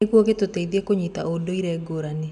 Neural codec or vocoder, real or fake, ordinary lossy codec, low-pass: none; real; none; 10.8 kHz